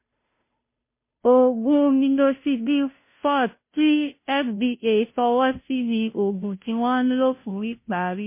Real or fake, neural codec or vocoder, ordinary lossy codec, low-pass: fake; codec, 16 kHz, 0.5 kbps, FunCodec, trained on Chinese and English, 25 frames a second; MP3, 24 kbps; 3.6 kHz